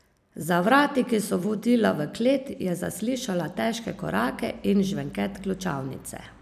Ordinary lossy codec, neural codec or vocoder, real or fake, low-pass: none; vocoder, 44.1 kHz, 128 mel bands every 256 samples, BigVGAN v2; fake; 14.4 kHz